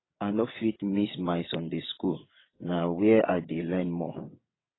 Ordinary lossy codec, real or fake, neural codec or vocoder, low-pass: AAC, 16 kbps; fake; vocoder, 22.05 kHz, 80 mel bands, WaveNeXt; 7.2 kHz